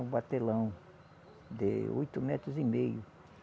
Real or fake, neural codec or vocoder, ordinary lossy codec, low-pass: real; none; none; none